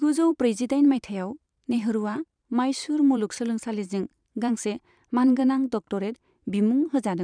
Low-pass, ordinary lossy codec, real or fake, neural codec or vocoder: 9.9 kHz; none; fake; vocoder, 44.1 kHz, 128 mel bands every 512 samples, BigVGAN v2